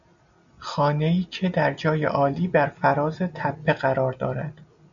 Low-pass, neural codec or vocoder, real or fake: 7.2 kHz; none; real